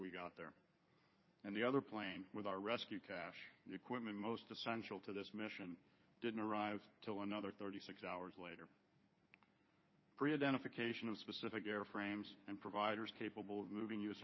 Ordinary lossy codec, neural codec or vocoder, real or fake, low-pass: MP3, 24 kbps; codec, 16 kHz in and 24 kHz out, 2.2 kbps, FireRedTTS-2 codec; fake; 7.2 kHz